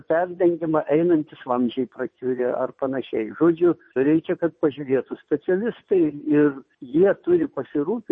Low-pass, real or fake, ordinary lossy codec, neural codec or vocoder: 9.9 kHz; fake; MP3, 32 kbps; vocoder, 22.05 kHz, 80 mel bands, WaveNeXt